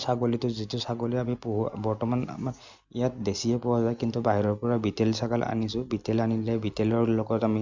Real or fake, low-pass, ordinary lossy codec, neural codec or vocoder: real; 7.2 kHz; Opus, 64 kbps; none